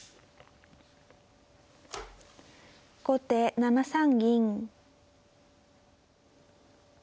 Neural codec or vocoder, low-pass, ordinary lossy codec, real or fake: none; none; none; real